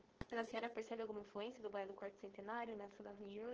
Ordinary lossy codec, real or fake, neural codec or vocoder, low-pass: Opus, 16 kbps; fake; codec, 16 kHz, 4.8 kbps, FACodec; 7.2 kHz